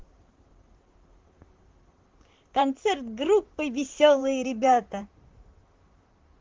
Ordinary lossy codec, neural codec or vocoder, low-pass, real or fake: Opus, 16 kbps; vocoder, 44.1 kHz, 128 mel bands, Pupu-Vocoder; 7.2 kHz; fake